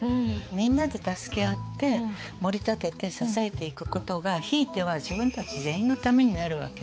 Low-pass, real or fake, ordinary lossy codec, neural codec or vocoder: none; fake; none; codec, 16 kHz, 4 kbps, X-Codec, HuBERT features, trained on balanced general audio